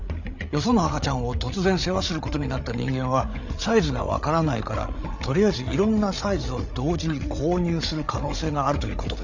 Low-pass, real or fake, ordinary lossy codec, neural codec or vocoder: 7.2 kHz; fake; none; codec, 16 kHz, 8 kbps, FreqCodec, larger model